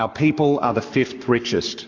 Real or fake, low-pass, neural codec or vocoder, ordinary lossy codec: fake; 7.2 kHz; codec, 44.1 kHz, 7.8 kbps, Pupu-Codec; AAC, 48 kbps